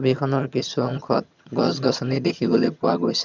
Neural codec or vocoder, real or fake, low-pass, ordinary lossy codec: vocoder, 22.05 kHz, 80 mel bands, HiFi-GAN; fake; 7.2 kHz; none